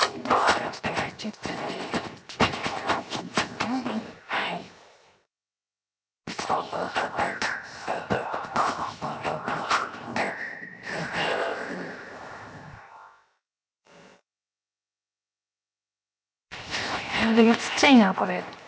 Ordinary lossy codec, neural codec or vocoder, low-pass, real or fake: none; codec, 16 kHz, 0.7 kbps, FocalCodec; none; fake